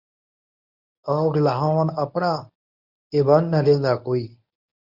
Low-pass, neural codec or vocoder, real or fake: 5.4 kHz; codec, 24 kHz, 0.9 kbps, WavTokenizer, medium speech release version 1; fake